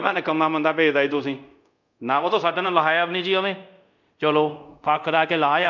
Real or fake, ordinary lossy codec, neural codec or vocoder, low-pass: fake; MP3, 64 kbps; codec, 24 kHz, 0.5 kbps, DualCodec; 7.2 kHz